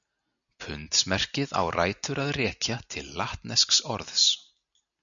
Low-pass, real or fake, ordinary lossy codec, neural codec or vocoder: 7.2 kHz; real; MP3, 96 kbps; none